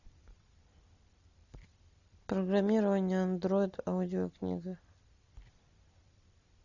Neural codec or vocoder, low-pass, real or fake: none; 7.2 kHz; real